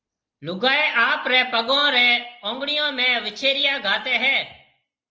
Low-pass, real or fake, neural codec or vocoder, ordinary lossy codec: 7.2 kHz; real; none; Opus, 24 kbps